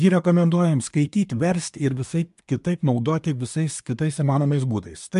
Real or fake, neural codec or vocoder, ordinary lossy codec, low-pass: fake; codec, 24 kHz, 1 kbps, SNAC; MP3, 64 kbps; 10.8 kHz